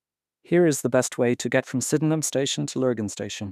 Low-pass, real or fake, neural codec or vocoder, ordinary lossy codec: 14.4 kHz; fake; autoencoder, 48 kHz, 32 numbers a frame, DAC-VAE, trained on Japanese speech; none